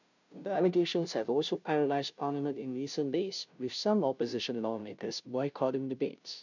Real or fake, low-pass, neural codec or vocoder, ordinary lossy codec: fake; 7.2 kHz; codec, 16 kHz, 0.5 kbps, FunCodec, trained on Chinese and English, 25 frames a second; none